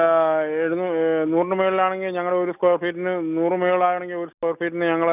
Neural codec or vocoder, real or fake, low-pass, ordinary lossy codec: none; real; 3.6 kHz; none